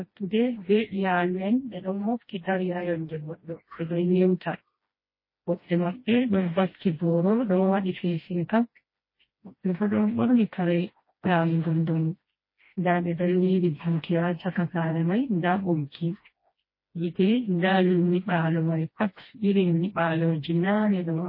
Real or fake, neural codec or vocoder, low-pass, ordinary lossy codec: fake; codec, 16 kHz, 1 kbps, FreqCodec, smaller model; 5.4 kHz; MP3, 24 kbps